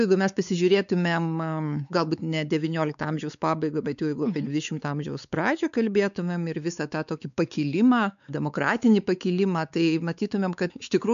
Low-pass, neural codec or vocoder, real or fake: 7.2 kHz; codec, 16 kHz, 4 kbps, X-Codec, WavLM features, trained on Multilingual LibriSpeech; fake